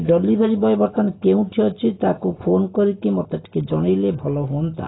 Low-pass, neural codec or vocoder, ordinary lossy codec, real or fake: 7.2 kHz; none; AAC, 16 kbps; real